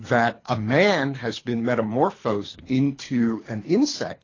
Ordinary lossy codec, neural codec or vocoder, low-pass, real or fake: AAC, 32 kbps; codec, 24 kHz, 3 kbps, HILCodec; 7.2 kHz; fake